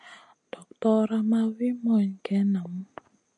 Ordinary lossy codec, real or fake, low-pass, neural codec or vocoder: MP3, 64 kbps; real; 9.9 kHz; none